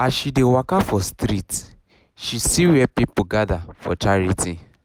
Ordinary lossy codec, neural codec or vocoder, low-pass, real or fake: none; none; 19.8 kHz; real